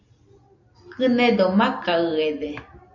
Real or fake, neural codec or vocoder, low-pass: real; none; 7.2 kHz